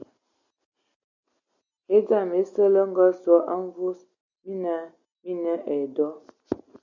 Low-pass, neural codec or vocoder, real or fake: 7.2 kHz; none; real